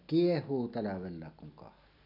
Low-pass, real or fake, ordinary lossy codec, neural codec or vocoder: 5.4 kHz; real; none; none